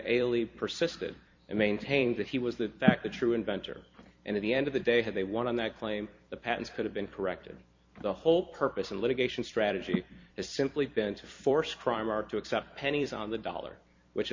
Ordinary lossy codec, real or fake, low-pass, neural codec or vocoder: MP3, 48 kbps; fake; 7.2 kHz; vocoder, 44.1 kHz, 128 mel bands every 256 samples, BigVGAN v2